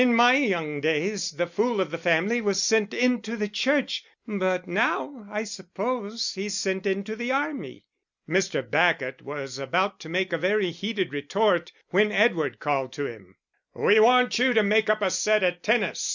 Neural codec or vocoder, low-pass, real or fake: none; 7.2 kHz; real